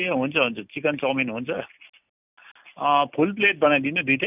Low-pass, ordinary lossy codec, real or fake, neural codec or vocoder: 3.6 kHz; none; real; none